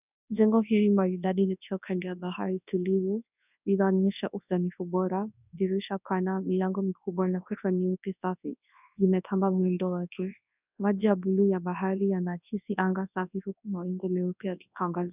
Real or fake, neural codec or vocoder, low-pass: fake; codec, 24 kHz, 0.9 kbps, WavTokenizer, large speech release; 3.6 kHz